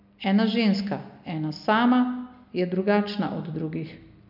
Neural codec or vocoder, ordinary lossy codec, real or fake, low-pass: none; none; real; 5.4 kHz